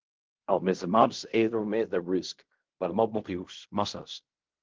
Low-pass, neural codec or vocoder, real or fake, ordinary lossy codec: 7.2 kHz; codec, 16 kHz in and 24 kHz out, 0.4 kbps, LongCat-Audio-Codec, fine tuned four codebook decoder; fake; Opus, 16 kbps